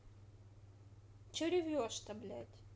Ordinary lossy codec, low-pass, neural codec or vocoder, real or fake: none; none; none; real